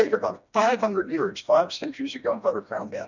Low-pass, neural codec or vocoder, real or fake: 7.2 kHz; codec, 16 kHz, 1 kbps, FreqCodec, smaller model; fake